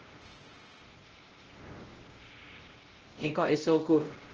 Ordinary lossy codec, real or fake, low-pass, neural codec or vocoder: Opus, 16 kbps; fake; 7.2 kHz; codec, 16 kHz, 0.5 kbps, X-Codec, WavLM features, trained on Multilingual LibriSpeech